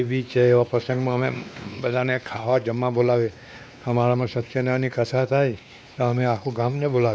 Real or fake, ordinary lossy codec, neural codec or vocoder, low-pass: fake; none; codec, 16 kHz, 2 kbps, X-Codec, WavLM features, trained on Multilingual LibriSpeech; none